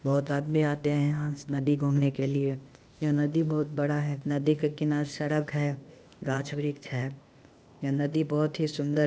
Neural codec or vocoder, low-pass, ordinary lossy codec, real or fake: codec, 16 kHz, 0.8 kbps, ZipCodec; none; none; fake